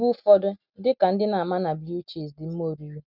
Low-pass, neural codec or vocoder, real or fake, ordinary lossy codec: 5.4 kHz; none; real; none